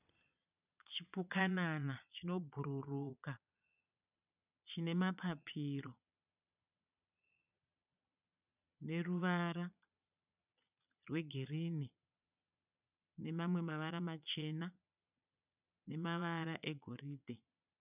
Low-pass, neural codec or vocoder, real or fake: 3.6 kHz; vocoder, 22.05 kHz, 80 mel bands, Vocos; fake